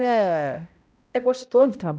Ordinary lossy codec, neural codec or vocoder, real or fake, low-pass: none; codec, 16 kHz, 0.5 kbps, X-Codec, HuBERT features, trained on balanced general audio; fake; none